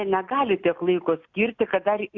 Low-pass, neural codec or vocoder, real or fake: 7.2 kHz; none; real